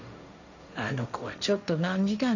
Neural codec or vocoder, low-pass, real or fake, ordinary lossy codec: codec, 16 kHz, 1.1 kbps, Voila-Tokenizer; 7.2 kHz; fake; none